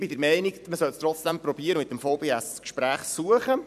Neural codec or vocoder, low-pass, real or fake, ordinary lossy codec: vocoder, 44.1 kHz, 128 mel bands every 256 samples, BigVGAN v2; 14.4 kHz; fake; MP3, 96 kbps